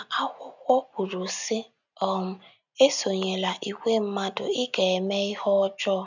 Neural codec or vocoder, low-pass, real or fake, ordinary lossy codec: none; 7.2 kHz; real; none